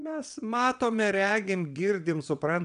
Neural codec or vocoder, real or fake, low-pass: vocoder, 22.05 kHz, 80 mel bands, WaveNeXt; fake; 9.9 kHz